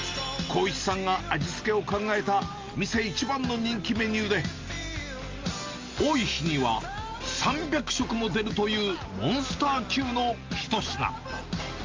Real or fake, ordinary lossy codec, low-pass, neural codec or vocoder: real; Opus, 32 kbps; 7.2 kHz; none